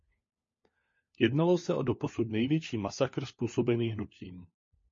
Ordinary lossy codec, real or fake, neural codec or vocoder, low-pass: MP3, 32 kbps; fake; codec, 16 kHz, 4 kbps, FunCodec, trained on LibriTTS, 50 frames a second; 7.2 kHz